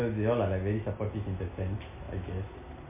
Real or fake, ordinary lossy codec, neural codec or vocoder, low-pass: real; MP3, 24 kbps; none; 3.6 kHz